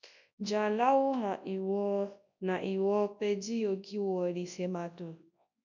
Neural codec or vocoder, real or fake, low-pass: codec, 24 kHz, 0.9 kbps, WavTokenizer, large speech release; fake; 7.2 kHz